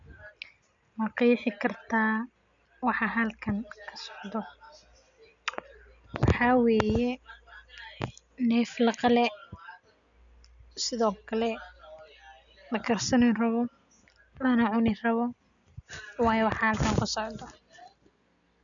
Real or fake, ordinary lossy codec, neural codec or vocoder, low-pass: real; none; none; 7.2 kHz